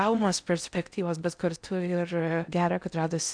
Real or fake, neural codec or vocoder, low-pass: fake; codec, 16 kHz in and 24 kHz out, 0.6 kbps, FocalCodec, streaming, 4096 codes; 9.9 kHz